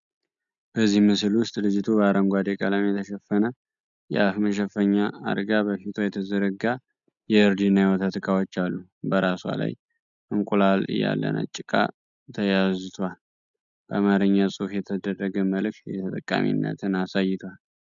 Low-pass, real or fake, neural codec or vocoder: 7.2 kHz; real; none